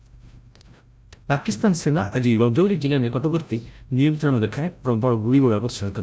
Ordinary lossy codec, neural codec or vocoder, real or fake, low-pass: none; codec, 16 kHz, 0.5 kbps, FreqCodec, larger model; fake; none